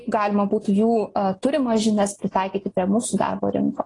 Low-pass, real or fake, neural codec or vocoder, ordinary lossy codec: 10.8 kHz; real; none; AAC, 32 kbps